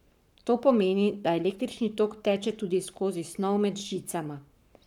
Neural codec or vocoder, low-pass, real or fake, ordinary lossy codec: codec, 44.1 kHz, 7.8 kbps, Pupu-Codec; 19.8 kHz; fake; none